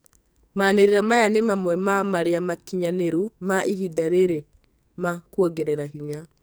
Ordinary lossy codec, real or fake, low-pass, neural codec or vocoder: none; fake; none; codec, 44.1 kHz, 2.6 kbps, SNAC